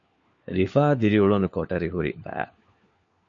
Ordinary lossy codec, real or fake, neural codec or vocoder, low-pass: MP3, 48 kbps; fake; codec, 16 kHz, 4 kbps, FunCodec, trained on LibriTTS, 50 frames a second; 7.2 kHz